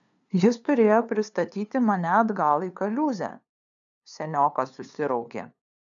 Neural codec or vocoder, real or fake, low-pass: codec, 16 kHz, 2 kbps, FunCodec, trained on LibriTTS, 25 frames a second; fake; 7.2 kHz